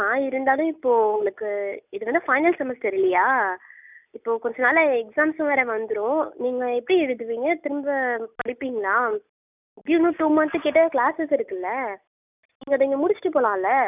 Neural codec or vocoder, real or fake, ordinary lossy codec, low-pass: none; real; none; 3.6 kHz